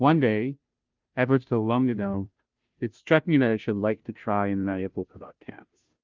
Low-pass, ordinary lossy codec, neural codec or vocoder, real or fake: 7.2 kHz; Opus, 24 kbps; codec, 16 kHz, 0.5 kbps, FunCodec, trained on Chinese and English, 25 frames a second; fake